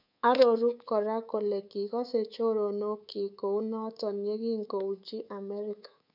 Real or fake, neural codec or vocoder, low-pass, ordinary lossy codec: fake; codec, 24 kHz, 3.1 kbps, DualCodec; 5.4 kHz; none